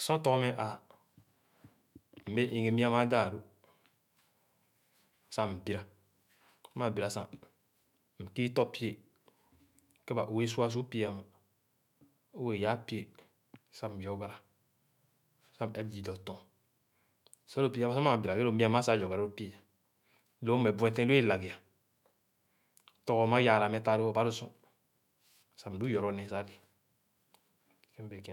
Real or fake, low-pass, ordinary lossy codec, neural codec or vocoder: fake; 19.8 kHz; MP3, 96 kbps; autoencoder, 48 kHz, 128 numbers a frame, DAC-VAE, trained on Japanese speech